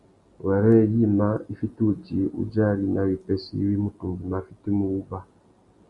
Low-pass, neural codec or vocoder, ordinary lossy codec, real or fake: 10.8 kHz; none; Opus, 64 kbps; real